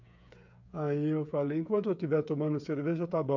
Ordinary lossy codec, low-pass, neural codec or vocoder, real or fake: MP3, 64 kbps; 7.2 kHz; codec, 16 kHz, 8 kbps, FreqCodec, smaller model; fake